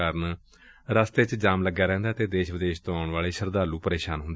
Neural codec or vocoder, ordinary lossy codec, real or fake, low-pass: none; none; real; none